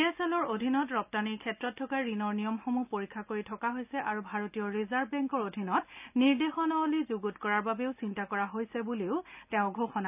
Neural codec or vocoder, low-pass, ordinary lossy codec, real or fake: none; 3.6 kHz; none; real